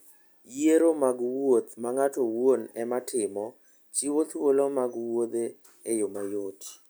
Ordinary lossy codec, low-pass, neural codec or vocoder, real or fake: none; none; none; real